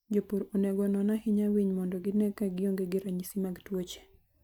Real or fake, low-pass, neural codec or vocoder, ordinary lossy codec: real; none; none; none